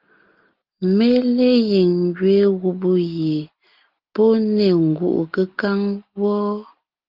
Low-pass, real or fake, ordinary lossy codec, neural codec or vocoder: 5.4 kHz; real; Opus, 32 kbps; none